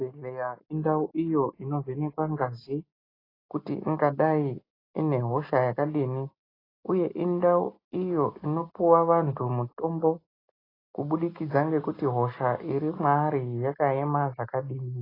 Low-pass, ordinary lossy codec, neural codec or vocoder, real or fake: 5.4 kHz; AAC, 24 kbps; none; real